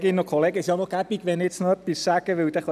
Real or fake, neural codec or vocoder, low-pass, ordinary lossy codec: real; none; 14.4 kHz; none